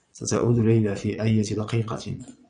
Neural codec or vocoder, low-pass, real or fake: vocoder, 22.05 kHz, 80 mel bands, Vocos; 9.9 kHz; fake